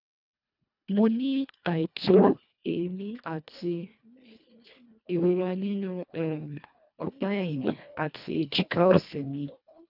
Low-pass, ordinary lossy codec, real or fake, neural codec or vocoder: 5.4 kHz; none; fake; codec, 24 kHz, 1.5 kbps, HILCodec